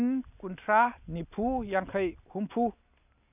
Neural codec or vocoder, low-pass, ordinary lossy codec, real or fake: none; 3.6 kHz; none; real